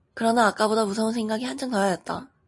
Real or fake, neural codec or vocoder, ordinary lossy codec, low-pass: real; none; MP3, 48 kbps; 10.8 kHz